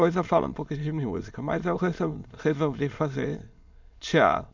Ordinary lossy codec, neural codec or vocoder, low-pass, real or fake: MP3, 64 kbps; autoencoder, 22.05 kHz, a latent of 192 numbers a frame, VITS, trained on many speakers; 7.2 kHz; fake